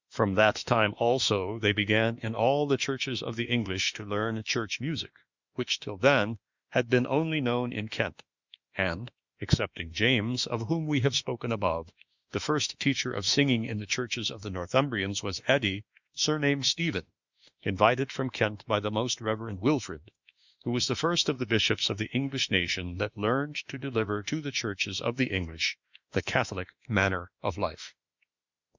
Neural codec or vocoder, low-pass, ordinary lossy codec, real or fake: autoencoder, 48 kHz, 32 numbers a frame, DAC-VAE, trained on Japanese speech; 7.2 kHz; Opus, 64 kbps; fake